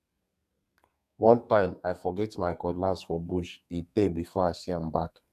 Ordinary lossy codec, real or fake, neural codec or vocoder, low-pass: none; fake; codec, 32 kHz, 1.9 kbps, SNAC; 14.4 kHz